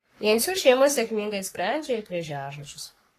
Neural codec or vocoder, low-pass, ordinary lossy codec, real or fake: codec, 44.1 kHz, 3.4 kbps, Pupu-Codec; 14.4 kHz; AAC, 48 kbps; fake